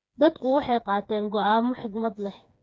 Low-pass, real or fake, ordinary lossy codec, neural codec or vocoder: none; fake; none; codec, 16 kHz, 4 kbps, FreqCodec, smaller model